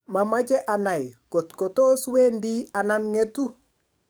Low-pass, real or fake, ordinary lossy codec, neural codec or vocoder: none; fake; none; codec, 44.1 kHz, 7.8 kbps, DAC